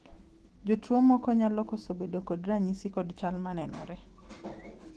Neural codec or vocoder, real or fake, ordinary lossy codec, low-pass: autoencoder, 48 kHz, 128 numbers a frame, DAC-VAE, trained on Japanese speech; fake; Opus, 16 kbps; 10.8 kHz